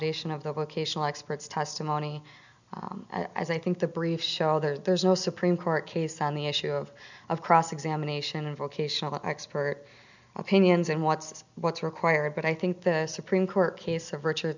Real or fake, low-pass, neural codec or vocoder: real; 7.2 kHz; none